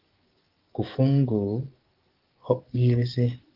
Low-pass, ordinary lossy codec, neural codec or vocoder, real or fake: 5.4 kHz; Opus, 16 kbps; codec, 16 kHz, 6 kbps, DAC; fake